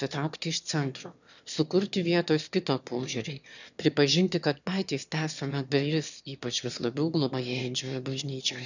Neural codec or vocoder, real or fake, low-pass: autoencoder, 22.05 kHz, a latent of 192 numbers a frame, VITS, trained on one speaker; fake; 7.2 kHz